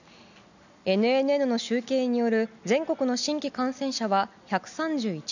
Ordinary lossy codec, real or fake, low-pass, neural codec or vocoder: none; real; 7.2 kHz; none